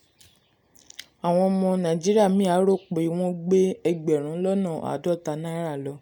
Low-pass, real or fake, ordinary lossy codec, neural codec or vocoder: 19.8 kHz; real; none; none